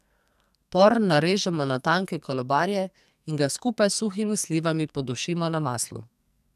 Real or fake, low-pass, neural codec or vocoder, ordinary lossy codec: fake; 14.4 kHz; codec, 44.1 kHz, 2.6 kbps, SNAC; none